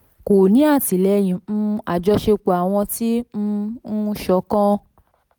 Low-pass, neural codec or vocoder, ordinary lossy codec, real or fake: none; none; none; real